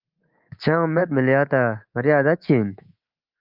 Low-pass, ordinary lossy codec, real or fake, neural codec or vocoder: 5.4 kHz; Opus, 24 kbps; fake; codec, 24 kHz, 3.1 kbps, DualCodec